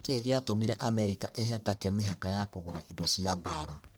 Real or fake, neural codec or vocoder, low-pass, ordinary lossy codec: fake; codec, 44.1 kHz, 1.7 kbps, Pupu-Codec; none; none